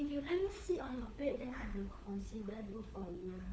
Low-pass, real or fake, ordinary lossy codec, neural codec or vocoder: none; fake; none; codec, 16 kHz, 4 kbps, FunCodec, trained on LibriTTS, 50 frames a second